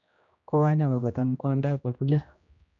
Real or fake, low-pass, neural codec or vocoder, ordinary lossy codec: fake; 7.2 kHz; codec, 16 kHz, 1 kbps, X-Codec, HuBERT features, trained on general audio; none